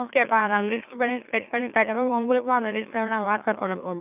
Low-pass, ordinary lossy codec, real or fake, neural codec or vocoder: 3.6 kHz; none; fake; autoencoder, 44.1 kHz, a latent of 192 numbers a frame, MeloTTS